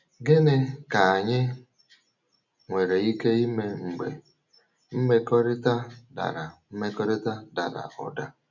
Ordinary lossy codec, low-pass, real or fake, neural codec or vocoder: none; 7.2 kHz; real; none